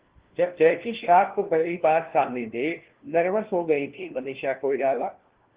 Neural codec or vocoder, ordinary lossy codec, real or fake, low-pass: codec, 16 kHz, 1 kbps, FunCodec, trained on LibriTTS, 50 frames a second; Opus, 16 kbps; fake; 3.6 kHz